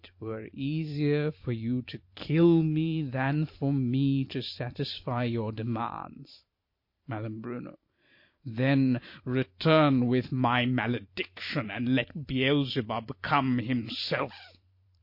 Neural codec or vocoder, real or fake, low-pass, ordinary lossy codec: vocoder, 44.1 kHz, 128 mel bands every 512 samples, BigVGAN v2; fake; 5.4 kHz; MP3, 32 kbps